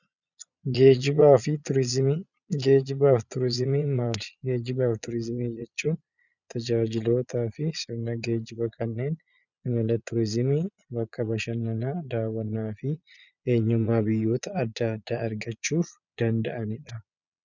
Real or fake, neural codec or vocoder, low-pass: fake; vocoder, 22.05 kHz, 80 mel bands, Vocos; 7.2 kHz